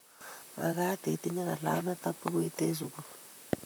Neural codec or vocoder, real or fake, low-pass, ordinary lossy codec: vocoder, 44.1 kHz, 128 mel bands, Pupu-Vocoder; fake; none; none